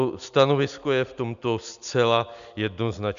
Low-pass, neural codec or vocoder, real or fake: 7.2 kHz; none; real